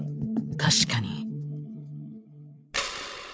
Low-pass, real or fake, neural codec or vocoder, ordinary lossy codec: none; fake; codec, 16 kHz, 8 kbps, FreqCodec, larger model; none